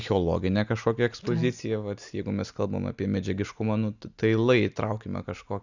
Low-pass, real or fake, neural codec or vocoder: 7.2 kHz; real; none